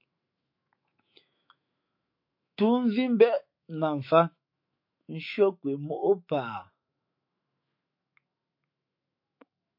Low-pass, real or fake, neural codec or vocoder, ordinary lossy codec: 5.4 kHz; fake; autoencoder, 48 kHz, 128 numbers a frame, DAC-VAE, trained on Japanese speech; MP3, 32 kbps